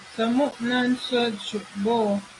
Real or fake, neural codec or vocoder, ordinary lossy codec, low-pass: real; none; AAC, 32 kbps; 10.8 kHz